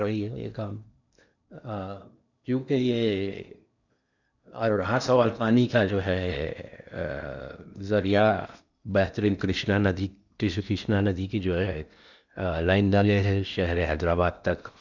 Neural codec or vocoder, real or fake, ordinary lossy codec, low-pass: codec, 16 kHz in and 24 kHz out, 0.8 kbps, FocalCodec, streaming, 65536 codes; fake; none; 7.2 kHz